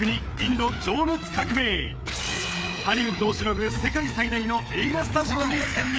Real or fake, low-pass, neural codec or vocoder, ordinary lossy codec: fake; none; codec, 16 kHz, 4 kbps, FreqCodec, larger model; none